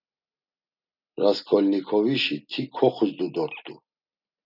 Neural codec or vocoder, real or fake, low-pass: none; real; 5.4 kHz